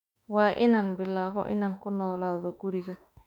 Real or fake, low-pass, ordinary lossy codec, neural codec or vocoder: fake; 19.8 kHz; none; autoencoder, 48 kHz, 32 numbers a frame, DAC-VAE, trained on Japanese speech